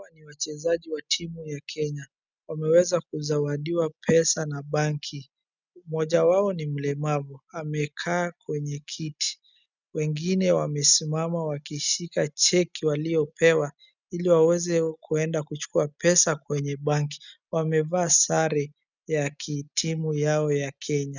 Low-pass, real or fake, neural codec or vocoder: 7.2 kHz; real; none